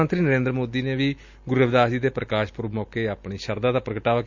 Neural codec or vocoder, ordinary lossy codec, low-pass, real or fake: none; Opus, 64 kbps; 7.2 kHz; real